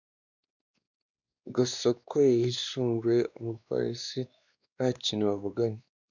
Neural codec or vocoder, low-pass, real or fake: codec, 16 kHz, 2 kbps, X-Codec, WavLM features, trained on Multilingual LibriSpeech; 7.2 kHz; fake